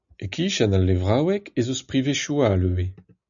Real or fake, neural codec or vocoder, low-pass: real; none; 7.2 kHz